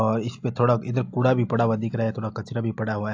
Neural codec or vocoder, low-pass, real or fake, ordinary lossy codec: none; 7.2 kHz; real; AAC, 48 kbps